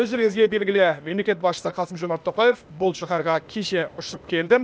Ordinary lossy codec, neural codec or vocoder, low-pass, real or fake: none; codec, 16 kHz, 0.8 kbps, ZipCodec; none; fake